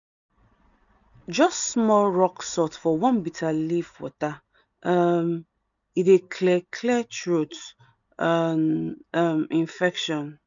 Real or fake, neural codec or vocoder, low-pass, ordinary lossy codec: real; none; 7.2 kHz; none